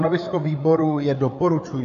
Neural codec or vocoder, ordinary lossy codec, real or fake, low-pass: codec, 16 kHz, 8 kbps, FreqCodec, larger model; AAC, 48 kbps; fake; 7.2 kHz